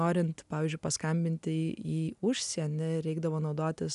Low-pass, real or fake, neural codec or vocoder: 10.8 kHz; real; none